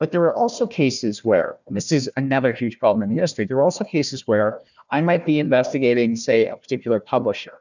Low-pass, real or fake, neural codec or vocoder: 7.2 kHz; fake; codec, 16 kHz, 1 kbps, FunCodec, trained on Chinese and English, 50 frames a second